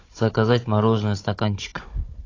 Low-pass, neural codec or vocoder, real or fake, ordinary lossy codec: 7.2 kHz; codec, 16 kHz, 16 kbps, FunCodec, trained on Chinese and English, 50 frames a second; fake; AAC, 32 kbps